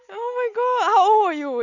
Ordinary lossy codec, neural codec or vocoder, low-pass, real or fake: none; none; 7.2 kHz; real